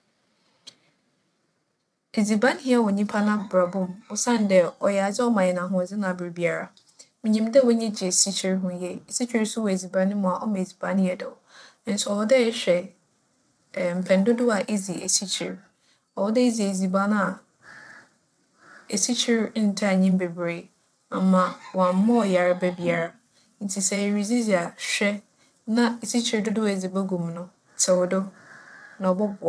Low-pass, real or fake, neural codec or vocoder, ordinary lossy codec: none; fake; vocoder, 22.05 kHz, 80 mel bands, WaveNeXt; none